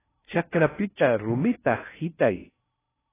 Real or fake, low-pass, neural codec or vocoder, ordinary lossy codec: fake; 3.6 kHz; codec, 16 kHz in and 24 kHz out, 0.6 kbps, FocalCodec, streaming, 4096 codes; AAC, 16 kbps